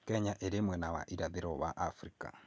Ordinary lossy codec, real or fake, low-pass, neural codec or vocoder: none; real; none; none